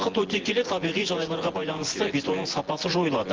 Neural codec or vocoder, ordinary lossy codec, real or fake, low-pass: vocoder, 24 kHz, 100 mel bands, Vocos; Opus, 16 kbps; fake; 7.2 kHz